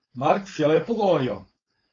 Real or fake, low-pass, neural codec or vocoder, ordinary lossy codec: fake; 7.2 kHz; codec, 16 kHz, 4.8 kbps, FACodec; AAC, 32 kbps